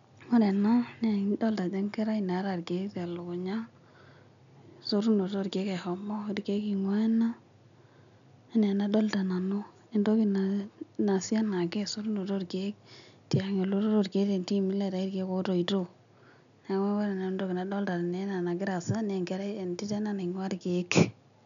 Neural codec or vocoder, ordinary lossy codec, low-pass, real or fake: none; none; 7.2 kHz; real